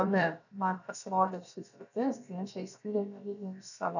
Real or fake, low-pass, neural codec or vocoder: fake; 7.2 kHz; codec, 16 kHz, about 1 kbps, DyCAST, with the encoder's durations